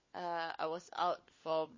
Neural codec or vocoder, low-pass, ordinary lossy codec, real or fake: codec, 16 kHz, 4 kbps, FunCodec, trained on LibriTTS, 50 frames a second; 7.2 kHz; MP3, 32 kbps; fake